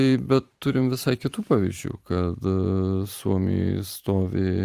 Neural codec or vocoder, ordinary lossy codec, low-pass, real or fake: vocoder, 44.1 kHz, 128 mel bands every 512 samples, BigVGAN v2; Opus, 32 kbps; 14.4 kHz; fake